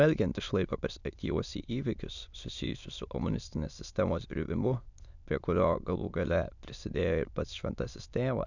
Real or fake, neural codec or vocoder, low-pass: fake; autoencoder, 22.05 kHz, a latent of 192 numbers a frame, VITS, trained on many speakers; 7.2 kHz